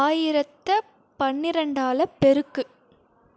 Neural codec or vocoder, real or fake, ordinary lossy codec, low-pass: none; real; none; none